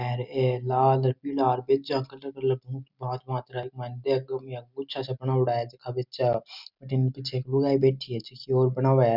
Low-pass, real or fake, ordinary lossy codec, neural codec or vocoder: 5.4 kHz; real; none; none